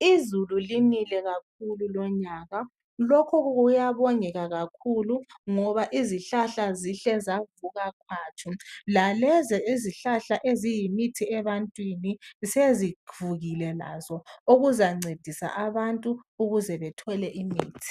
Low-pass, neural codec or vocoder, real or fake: 14.4 kHz; none; real